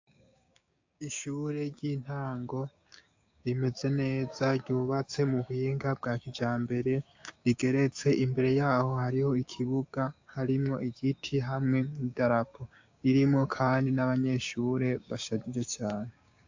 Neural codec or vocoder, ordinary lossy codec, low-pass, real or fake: codec, 16 kHz, 6 kbps, DAC; AAC, 48 kbps; 7.2 kHz; fake